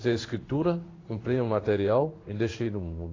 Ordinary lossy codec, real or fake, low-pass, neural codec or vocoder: AAC, 32 kbps; fake; 7.2 kHz; codec, 16 kHz in and 24 kHz out, 1 kbps, XY-Tokenizer